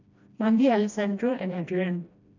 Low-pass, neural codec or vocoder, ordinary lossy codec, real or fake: 7.2 kHz; codec, 16 kHz, 1 kbps, FreqCodec, smaller model; none; fake